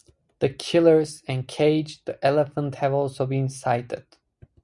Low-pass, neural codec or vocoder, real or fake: 10.8 kHz; none; real